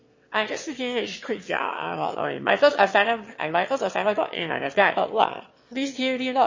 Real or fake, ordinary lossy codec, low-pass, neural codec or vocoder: fake; MP3, 32 kbps; 7.2 kHz; autoencoder, 22.05 kHz, a latent of 192 numbers a frame, VITS, trained on one speaker